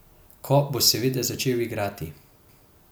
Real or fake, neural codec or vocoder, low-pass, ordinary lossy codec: real; none; none; none